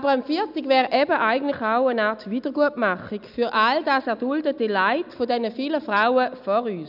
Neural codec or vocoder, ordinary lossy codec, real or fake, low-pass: none; none; real; 5.4 kHz